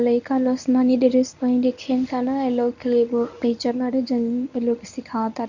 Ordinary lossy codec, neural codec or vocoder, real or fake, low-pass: none; codec, 24 kHz, 0.9 kbps, WavTokenizer, medium speech release version 1; fake; 7.2 kHz